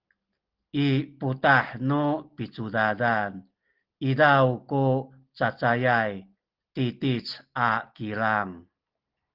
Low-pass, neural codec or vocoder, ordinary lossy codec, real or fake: 5.4 kHz; none; Opus, 16 kbps; real